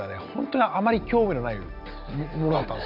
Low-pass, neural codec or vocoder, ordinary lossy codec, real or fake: 5.4 kHz; none; none; real